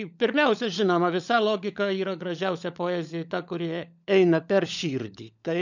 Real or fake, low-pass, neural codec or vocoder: fake; 7.2 kHz; codec, 16 kHz, 16 kbps, FunCodec, trained on LibriTTS, 50 frames a second